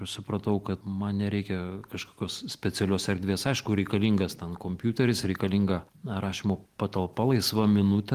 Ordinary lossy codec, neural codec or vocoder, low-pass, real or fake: Opus, 24 kbps; none; 14.4 kHz; real